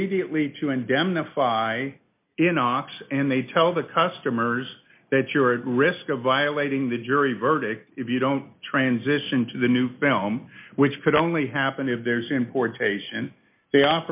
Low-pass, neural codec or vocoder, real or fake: 3.6 kHz; none; real